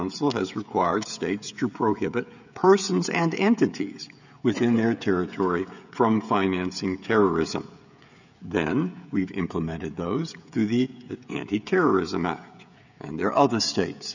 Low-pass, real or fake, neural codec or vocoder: 7.2 kHz; fake; codec, 16 kHz, 16 kbps, FreqCodec, smaller model